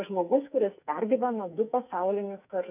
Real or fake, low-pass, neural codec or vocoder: fake; 3.6 kHz; codec, 44.1 kHz, 2.6 kbps, SNAC